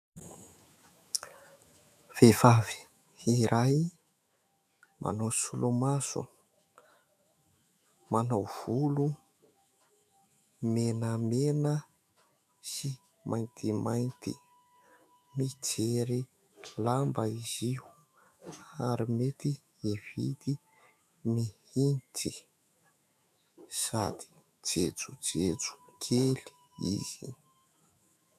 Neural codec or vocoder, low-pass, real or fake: autoencoder, 48 kHz, 128 numbers a frame, DAC-VAE, trained on Japanese speech; 14.4 kHz; fake